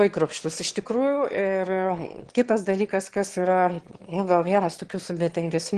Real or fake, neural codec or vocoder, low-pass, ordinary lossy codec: fake; autoencoder, 22.05 kHz, a latent of 192 numbers a frame, VITS, trained on one speaker; 9.9 kHz; Opus, 16 kbps